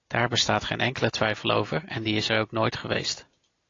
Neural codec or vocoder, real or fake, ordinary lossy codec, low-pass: none; real; AAC, 32 kbps; 7.2 kHz